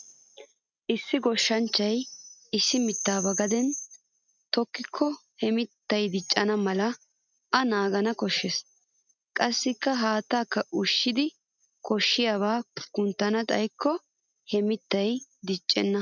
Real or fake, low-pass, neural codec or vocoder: real; 7.2 kHz; none